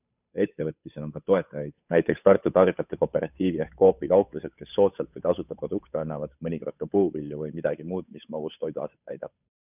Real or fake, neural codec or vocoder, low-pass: fake; codec, 16 kHz, 8 kbps, FunCodec, trained on Chinese and English, 25 frames a second; 3.6 kHz